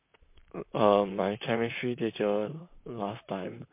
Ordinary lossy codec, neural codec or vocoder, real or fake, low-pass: MP3, 32 kbps; vocoder, 44.1 kHz, 128 mel bands, Pupu-Vocoder; fake; 3.6 kHz